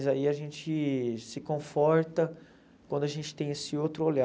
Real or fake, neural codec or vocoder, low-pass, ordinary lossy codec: real; none; none; none